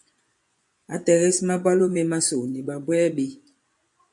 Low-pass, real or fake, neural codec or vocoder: 10.8 kHz; fake; vocoder, 24 kHz, 100 mel bands, Vocos